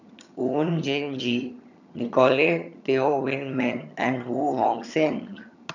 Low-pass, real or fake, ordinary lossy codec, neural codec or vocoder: 7.2 kHz; fake; none; vocoder, 22.05 kHz, 80 mel bands, HiFi-GAN